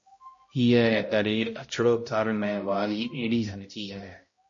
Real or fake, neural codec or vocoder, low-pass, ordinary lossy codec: fake; codec, 16 kHz, 0.5 kbps, X-Codec, HuBERT features, trained on balanced general audio; 7.2 kHz; MP3, 32 kbps